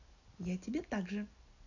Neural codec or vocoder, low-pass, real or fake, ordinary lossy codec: none; 7.2 kHz; real; none